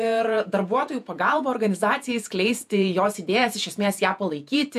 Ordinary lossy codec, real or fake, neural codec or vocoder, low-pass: MP3, 96 kbps; fake; vocoder, 48 kHz, 128 mel bands, Vocos; 14.4 kHz